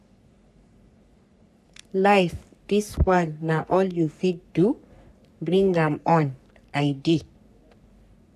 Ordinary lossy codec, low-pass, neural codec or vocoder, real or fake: none; 14.4 kHz; codec, 44.1 kHz, 3.4 kbps, Pupu-Codec; fake